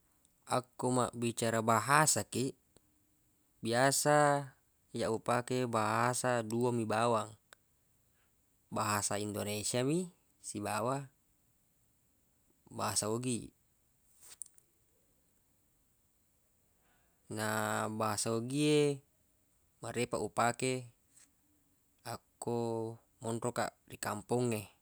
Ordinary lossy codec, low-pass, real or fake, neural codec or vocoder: none; none; real; none